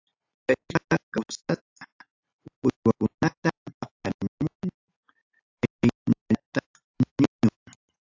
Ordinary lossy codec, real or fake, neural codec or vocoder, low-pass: AAC, 48 kbps; real; none; 7.2 kHz